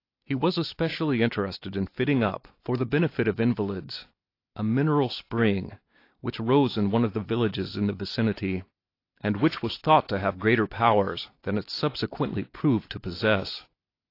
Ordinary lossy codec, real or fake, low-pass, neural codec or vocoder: AAC, 32 kbps; real; 5.4 kHz; none